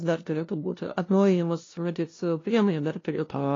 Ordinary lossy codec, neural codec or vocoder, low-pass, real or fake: AAC, 32 kbps; codec, 16 kHz, 0.5 kbps, FunCodec, trained on LibriTTS, 25 frames a second; 7.2 kHz; fake